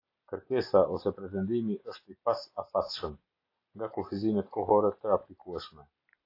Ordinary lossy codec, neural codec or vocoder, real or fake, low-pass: AAC, 32 kbps; none; real; 5.4 kHz